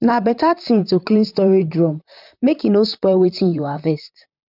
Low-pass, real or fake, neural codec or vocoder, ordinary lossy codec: 5.4 kHz; fake; vocoder, 44.1 kHz, 128 mel bands, Pupu-Vocoder; none